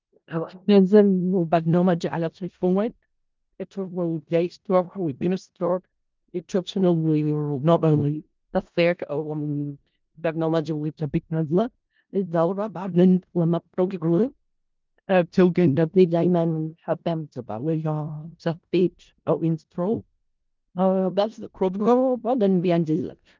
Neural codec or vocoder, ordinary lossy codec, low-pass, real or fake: codec, 16 kHz in and 24 kHz out, 0.4 kbps, LongCat-Audio-Codec, four codebook decoder; Opus, 24 kbps; 7.2 kHz; fake